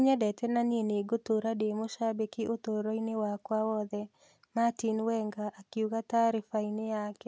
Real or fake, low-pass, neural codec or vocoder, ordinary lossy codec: real; none; none; none